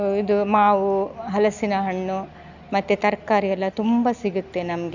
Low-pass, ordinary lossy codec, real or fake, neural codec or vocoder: 7.2 kHz; none; real; none